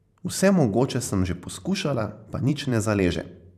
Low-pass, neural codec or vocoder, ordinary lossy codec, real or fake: 14.4 kHz; vocoder, 44.1 kHz, 128 mel bands every 512 samples, BigVGAN v2; none; fake